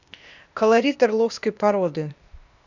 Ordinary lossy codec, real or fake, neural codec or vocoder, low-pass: none; fake; codec, 16 kHz, 0.8 kbps, ZipCodec; 7.2 kHz